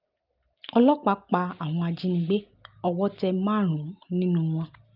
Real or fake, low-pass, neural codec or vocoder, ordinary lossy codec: real; 5.4 kHz; none; Opus, 24 kbps